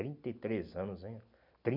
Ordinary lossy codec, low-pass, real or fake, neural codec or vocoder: none; 5.4 kHz; real; none